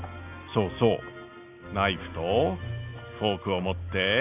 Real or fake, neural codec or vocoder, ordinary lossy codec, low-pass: real; none; none; 3.6 kHz